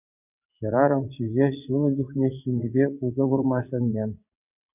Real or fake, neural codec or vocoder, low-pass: fake; vocoder, 22.05 kHz, 80 mel bands, WaveNeXt; 3.6 kHz